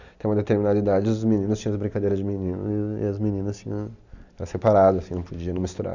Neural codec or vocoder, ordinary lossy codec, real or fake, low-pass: none; none; real; 7.2 kHz